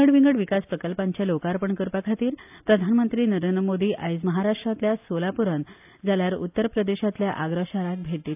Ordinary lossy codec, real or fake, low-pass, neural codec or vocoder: AAC, 32 kbps; real; 3.6 kHz; none